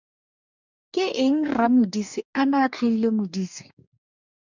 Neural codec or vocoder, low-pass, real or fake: codec, 44.1 kHz, 2.6 kbps, DAC; 7.2 kHz; fake